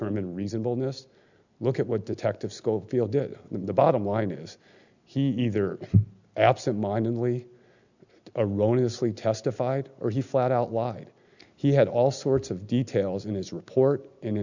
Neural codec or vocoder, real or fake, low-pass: none; real; 7.2 kHz